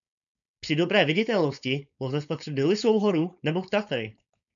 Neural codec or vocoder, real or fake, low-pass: codec, 16 kHz, 4.8 kbps, FACodec; fake; 7.2 kHz